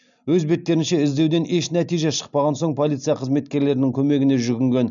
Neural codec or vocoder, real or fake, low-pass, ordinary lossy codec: none; real; 7.2 kHz; none